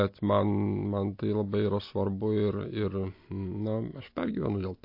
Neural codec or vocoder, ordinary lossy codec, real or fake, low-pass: none; MP3, 32 kbps; real; 5.4 kHz